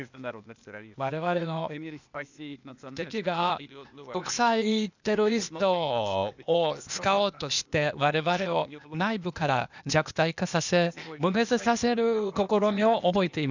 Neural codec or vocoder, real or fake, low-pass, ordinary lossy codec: codec, 16 kHz, 0.8 kbps, ZipCodec; fake; 7.2 kHz; none